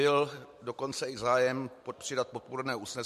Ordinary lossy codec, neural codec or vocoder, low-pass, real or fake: MP3, 64 kbps; none; 14.4 kHz; real